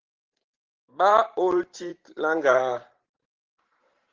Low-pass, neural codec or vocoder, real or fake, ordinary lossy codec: 7.2 kHz; vocoder, 44.1 kHz, 128 mel bands every 512 samples, BigVGAN v2; fake; Opus, 16 kbps